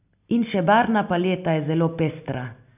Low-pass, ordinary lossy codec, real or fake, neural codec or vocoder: 3.6 kHz; none; real; none